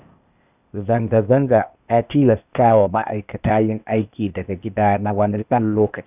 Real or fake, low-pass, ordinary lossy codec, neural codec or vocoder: fake; 3.6 kHz; none; codec, 16 kHz, 0.8 kbps, ZipCodec